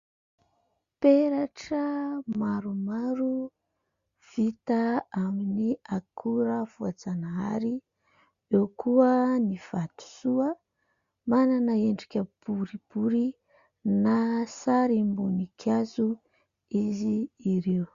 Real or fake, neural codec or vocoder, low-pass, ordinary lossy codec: real; none; 7.2 kHz; Opus, 64 kbps